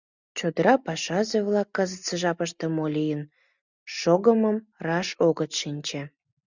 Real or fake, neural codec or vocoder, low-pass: real; none; 7.2 kHz